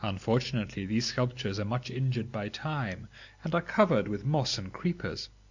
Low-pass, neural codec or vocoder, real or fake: 7.2 kHz; none; real